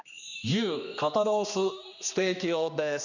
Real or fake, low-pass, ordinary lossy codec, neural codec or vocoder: fake; 7.2 kHz; none; codec, 16 kHz, 2 kbps, X-Codec, HuBERT features, trained on general audio